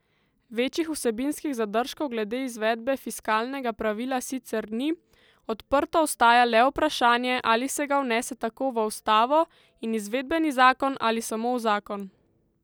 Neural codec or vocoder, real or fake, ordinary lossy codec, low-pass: none; real; none; none